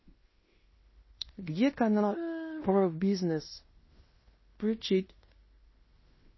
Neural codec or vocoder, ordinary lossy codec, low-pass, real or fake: codec, 16 kHz in and 24 kHz out, 0.9 kbps, LongCat-Audio-Codec, fine tuned four codebook decoder; MP3, 24 kbps; 7.2 kHz; fake